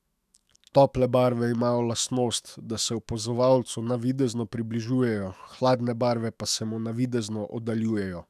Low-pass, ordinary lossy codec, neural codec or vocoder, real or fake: 14.4 kHz; none; autoencoder, 48 kHz, 128 numbers a frame, DAC-VAE, trained on Japanese speech; fake